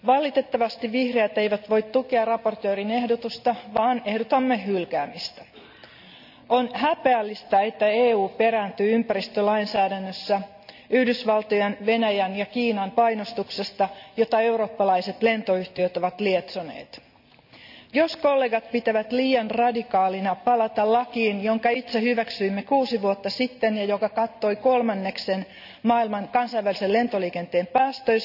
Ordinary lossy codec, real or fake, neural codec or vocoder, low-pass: none; real; none; 5.4 kHz